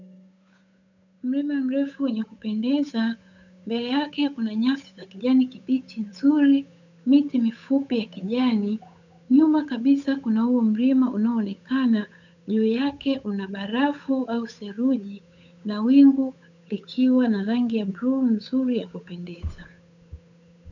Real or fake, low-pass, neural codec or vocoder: fake; 7.2 kHz; codec, 16 kHz, 8 kbps, FunCodec, trained on Chinese and English, 25 frames a second